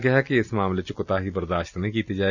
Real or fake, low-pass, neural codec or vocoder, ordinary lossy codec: real; 7.2 kHz; none; none